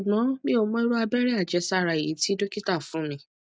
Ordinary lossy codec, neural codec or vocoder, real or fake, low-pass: none; none; real; none